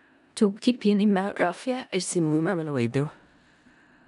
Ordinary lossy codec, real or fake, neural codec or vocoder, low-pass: none; fake; codec, 16 kHz in and 24 kHz out, 0.4 kbps, LongCat-Audio-Codec, four codebook decoder; 10.8 kHz